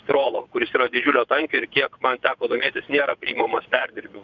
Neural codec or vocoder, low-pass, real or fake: vocoder, 22.05 kHz, 80 mel bands, WaveNeXt; 7.2 kHz; fake